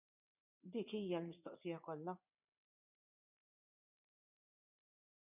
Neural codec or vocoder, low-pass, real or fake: vocoder, 44.1 kHz, 80 mel bands, Vocos; 3.6 kHz; fake